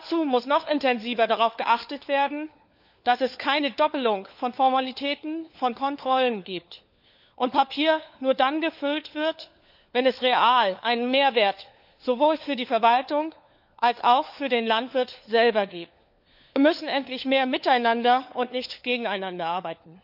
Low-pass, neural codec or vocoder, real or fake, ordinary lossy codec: 5.4 kHz; codec, 16 kHz, 4 kbps, FunCodec, trained on LibriTTS, 50 frames a second; fake; none